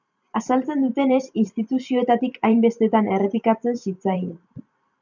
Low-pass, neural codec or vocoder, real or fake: 7.2 kHz; vocoder, 44.1 kHz, 128 mel bands every 512 samples, BigVGAN v2; fake